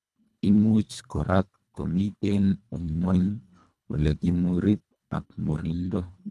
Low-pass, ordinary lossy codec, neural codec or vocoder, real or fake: none; none; codec, 24 kHz, 1.5 kbps, HILCodec; fake